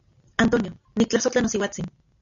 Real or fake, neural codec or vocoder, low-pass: real; none; 7.2 kHz